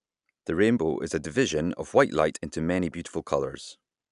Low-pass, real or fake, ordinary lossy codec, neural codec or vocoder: 10.8 kHz; real; none; none